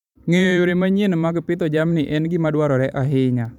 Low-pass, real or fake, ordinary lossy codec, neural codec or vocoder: 19.8 kHz; fake; none; vocoder, 44.1 kHz, 128 mel bands every 512 samples, BigVGAN v2